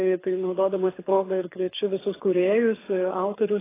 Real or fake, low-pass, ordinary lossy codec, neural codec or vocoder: fake; 3.6 kHz; AAC, 16 kbps; codec, 24 kHz, 6 kbps, HILCodec